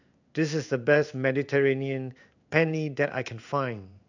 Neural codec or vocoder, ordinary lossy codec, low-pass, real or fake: codec, 16 kHz in and 24 kHz out, 1 kbps, XY-Tokenizer; none; 7.2 kHz; fake